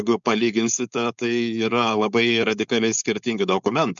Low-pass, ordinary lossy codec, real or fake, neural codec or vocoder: 7.2 kHz; MP3, 96 kbps; fake; codec, 16 kHz, 4.8 kbps, FACodec